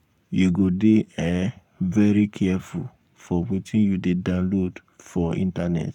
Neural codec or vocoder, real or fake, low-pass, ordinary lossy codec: codec, 44.1 kHz, 7.8 kbps, Pupu-Codec; fake; 19.8 kHz; none